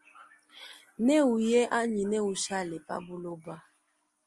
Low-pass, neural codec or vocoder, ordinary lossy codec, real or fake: 10.8 kHz; none; Opus, 32 kbps; real